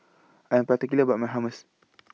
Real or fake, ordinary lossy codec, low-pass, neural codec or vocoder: real; none; none; none